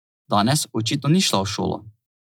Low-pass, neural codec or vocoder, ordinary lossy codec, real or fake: none; none; none; real